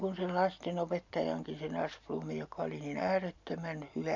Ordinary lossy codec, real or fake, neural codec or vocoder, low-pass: MP3, 64 kbps; real; none; 7.2 kHz